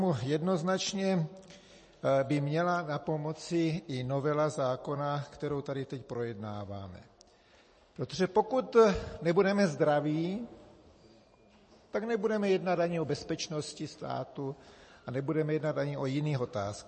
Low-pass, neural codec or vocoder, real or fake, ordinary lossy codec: 10.8 kHz; none; real; MP3, 32 kbps